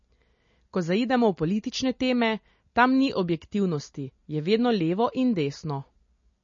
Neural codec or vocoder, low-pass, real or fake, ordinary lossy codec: none; 7.2 kHz; real; MP3, 32 kbps